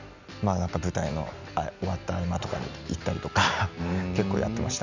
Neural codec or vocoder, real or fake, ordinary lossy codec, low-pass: none; real; none; 7.2 kHz